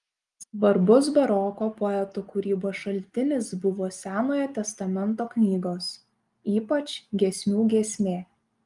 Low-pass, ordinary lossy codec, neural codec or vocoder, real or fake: 10.8 kHz; Opus, 32 kbps; none; real